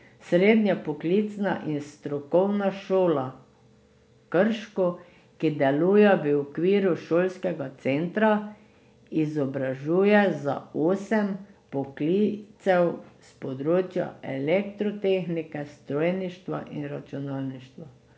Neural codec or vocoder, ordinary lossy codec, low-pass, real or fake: none; none; none; real